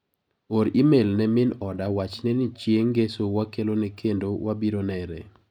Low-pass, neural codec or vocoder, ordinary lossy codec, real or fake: 19.8 kHz; none; none; real